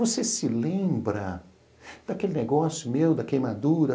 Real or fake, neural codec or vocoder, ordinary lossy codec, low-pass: real; none; none; none